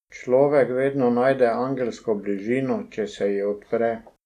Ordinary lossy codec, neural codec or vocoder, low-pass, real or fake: none; none; 14.4 kHz; real